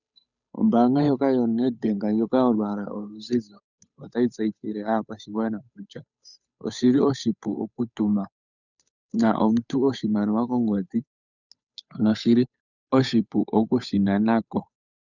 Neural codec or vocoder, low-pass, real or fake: codec, 16 kHz, 8 kbps, FunCodec, trained on Chinese and English, 25 frames a second; 7.2 kHz; fake